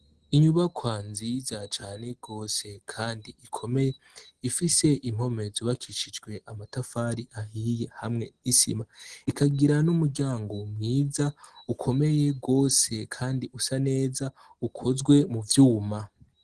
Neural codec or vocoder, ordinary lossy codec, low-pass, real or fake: none; Opus, 16 kbps; 9.9 kHz; real